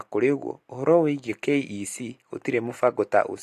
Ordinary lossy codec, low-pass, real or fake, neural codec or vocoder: AAC, 64 kbps; 14.4 kHz; real; none